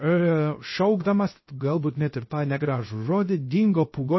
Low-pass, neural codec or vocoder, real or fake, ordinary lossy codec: 7.2 kHz; codec, 16 kHz, 0.3 kbps, FocalCodec; fake; MP3, 24 kbps